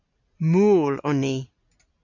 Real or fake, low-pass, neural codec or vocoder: real; 7.2 kHz; none